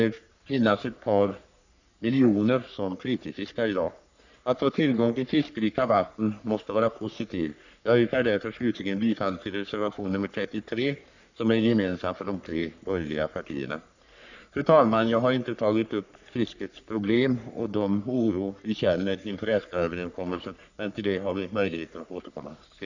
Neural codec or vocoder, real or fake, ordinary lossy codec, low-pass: codec, 44.1 kHz, 3.4 kbps, Pupu-Codec; fake; none; 7.2 kHz